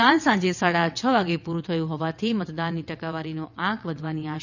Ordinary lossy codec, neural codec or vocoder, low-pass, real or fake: none; vocoder, 22.05 kHz, 80 mel bands, WaveNeXt; 7.2 kHz; fake